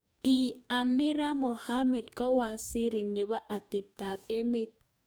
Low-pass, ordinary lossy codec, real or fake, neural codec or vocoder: none; none; fake; codec, 44.1 kHz, 2.6 kbps, DAC